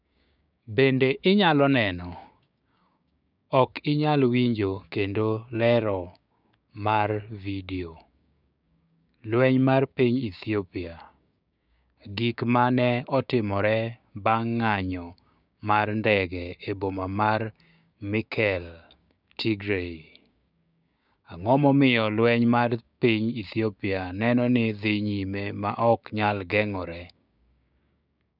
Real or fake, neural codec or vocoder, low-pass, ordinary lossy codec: fake; codec, 16 kHz, 6 kbps, DAC; 5.4 kHz; none